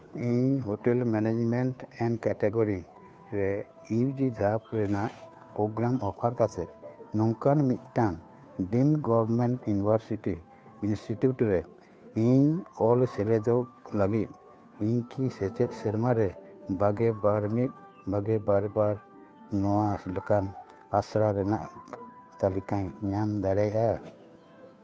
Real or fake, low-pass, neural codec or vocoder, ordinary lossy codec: fake; none; codec, 16 kHz, 2 kbps, FunCodec, trained on Chinese and English, 25 frames a second; none